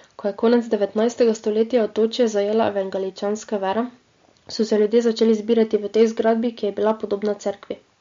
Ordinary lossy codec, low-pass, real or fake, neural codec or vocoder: MP3, 48 kbps; 7.2 kHz; real; none